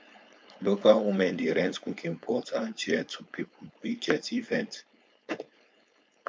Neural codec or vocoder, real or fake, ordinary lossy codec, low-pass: codec, 16 kHz, 4.8 kbps, FACodec; fake; none; none